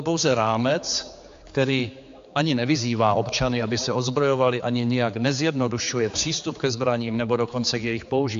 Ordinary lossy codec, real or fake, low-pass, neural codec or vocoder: AAC, 64 kbps; fake; 7.2 kHz; codec, 16 kHz, 4 kbps, X-Codec, HuBERT features, trained on general audio